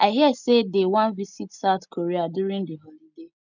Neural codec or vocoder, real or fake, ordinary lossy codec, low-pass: none; real; none; 7.2 kHz